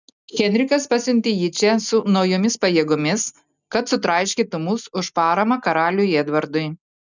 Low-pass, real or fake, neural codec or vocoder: 7.2 kHz; real; none